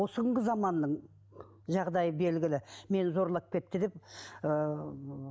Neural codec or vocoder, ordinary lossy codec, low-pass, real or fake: none; none; none; real